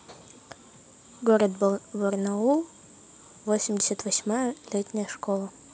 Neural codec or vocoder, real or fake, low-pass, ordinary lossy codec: none; real; none; none